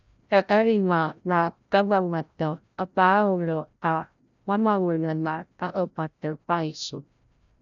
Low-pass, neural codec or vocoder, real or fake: 7.2 kHz; codec, 16 kHz, 0.5 kbps, FreqCodec, larger model; fake